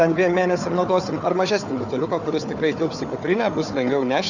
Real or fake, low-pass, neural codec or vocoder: fake; 7.2 kHz; codec, 16 kHz, 4 kbps, FunCodec, trained on Chinese and English, 50 frames a second